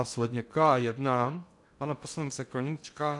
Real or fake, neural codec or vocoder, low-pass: fake; codec, 16 kHz in and 24 kHz out, 0.6 kbps, FocalCodec, streaming, 2048 codes; 10.8 kHz